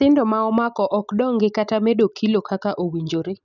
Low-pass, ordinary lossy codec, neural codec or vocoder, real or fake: 7.2 kHz; none; none; real